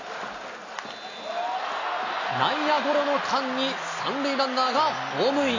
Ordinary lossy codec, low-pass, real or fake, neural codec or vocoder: AAC, 32 kbps; 7.2 kHz; real; none